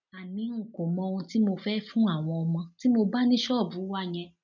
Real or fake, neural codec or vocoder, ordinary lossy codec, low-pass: real; none; none; 7.2 kHz